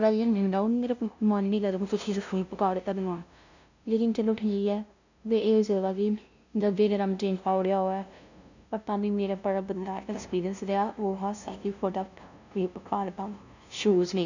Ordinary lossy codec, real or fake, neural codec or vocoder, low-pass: none; fake; codec, 16 kHz, 0.5 kbps, FunCodec, trained on LibriTTS, 25 frames a second; 7.2 kHz